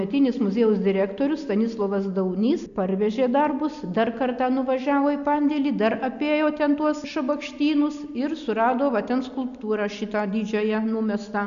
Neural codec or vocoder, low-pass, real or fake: none; 7.2 kHz; real